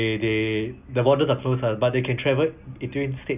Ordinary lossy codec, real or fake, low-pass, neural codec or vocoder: none; real; 3.6 kHz; none